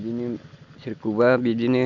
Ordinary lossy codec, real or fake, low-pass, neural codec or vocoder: none; real; 7.2 kHz; none